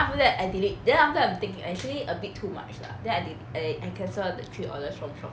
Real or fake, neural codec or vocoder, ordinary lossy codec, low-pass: real; none; none; none